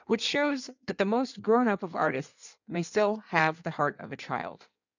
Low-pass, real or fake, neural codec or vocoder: 7.2 kHz; fake; codec, 16 kHz in and 24 kHz out, 1.1 kbps, FireRedTTS-2 codec